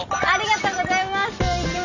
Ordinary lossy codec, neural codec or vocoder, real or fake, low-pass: none; none; real; 7.2 kHz